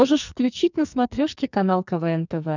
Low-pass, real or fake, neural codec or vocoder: 7.2 kHz; fake; codec, 44.1 kHz, 2.6 kbps, SNAC